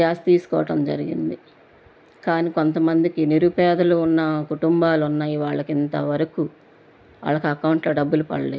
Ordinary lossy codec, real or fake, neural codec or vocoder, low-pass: none; real; none; none